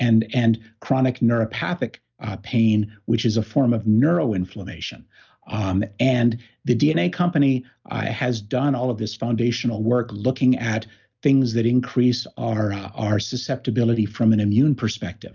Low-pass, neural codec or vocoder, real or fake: 7.2 kHz; none; real